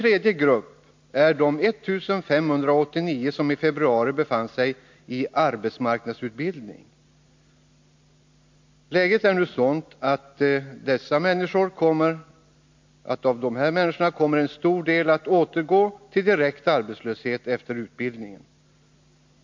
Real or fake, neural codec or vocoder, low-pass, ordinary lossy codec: real; none; 7.2 kHz; MP3, 48 kbps